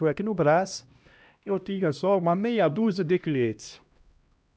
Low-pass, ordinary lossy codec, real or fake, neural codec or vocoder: none; none; fake; codec, 16 kHz, 1 kbps, X-Codec, HuBERT features, trained on LibriSpeech